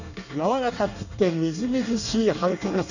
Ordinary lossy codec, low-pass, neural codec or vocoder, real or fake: none; 7.2 kHz; codec, 24 kHz, 1 kbps, SNAC; fake